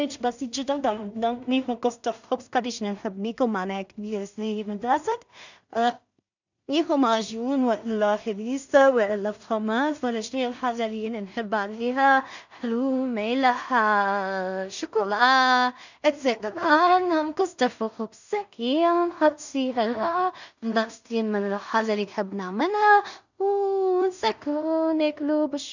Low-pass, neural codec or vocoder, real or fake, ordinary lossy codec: 7.2 kHz; codec, 16 kHz in and 24 kHz out, 0.4 kbps, LongCat-Audio-Codec, two codebook decoder; fake; none